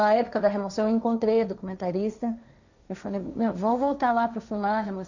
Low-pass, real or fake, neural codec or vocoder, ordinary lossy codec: 7.2 kHz; fake; codec, 16 kHz, 1.1 kbps, Voila-Tokenizer; none